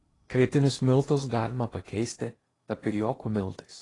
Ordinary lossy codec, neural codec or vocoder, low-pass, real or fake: AAC, 32 kbps; codec, 16 kHz in and 24 kHz out, 0.8 kbps, FocalCodec, streaming, 65536 codes; 10.8 kHz; fake